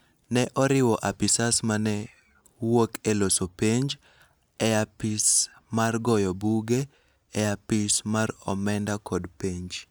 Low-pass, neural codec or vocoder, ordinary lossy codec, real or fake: none; none; none; real